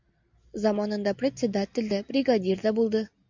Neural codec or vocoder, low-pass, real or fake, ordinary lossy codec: none; 7.2 kHz; real; MP3, 64 kbps